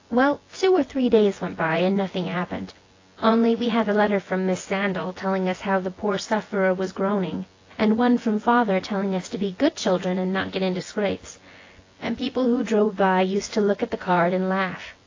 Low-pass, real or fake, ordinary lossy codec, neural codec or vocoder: 7.2 kHz; fake; AAC, 32 kbps; vocoder, 24 kHz, 100 mel bands, Vocos